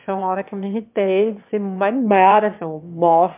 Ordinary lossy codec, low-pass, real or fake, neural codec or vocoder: MP3, 32 kbps; 3.6 kHz; fake; autoencoder, 22.05 kHz, a latent of 192 numbers a frame, VITS, trained on one speaker